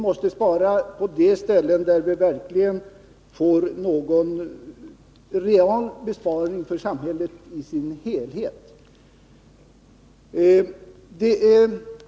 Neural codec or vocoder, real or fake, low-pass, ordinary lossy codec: none; real; none; none